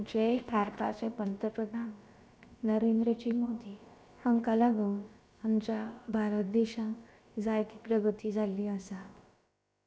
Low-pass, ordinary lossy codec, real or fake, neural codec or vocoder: none; none; fake; codec, 16 kHz, about 1 kbps, DyCAST, with the encoder's durations